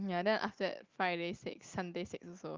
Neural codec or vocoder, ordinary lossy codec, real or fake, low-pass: none; Opus, 24 kbps; real; 7.2 kHz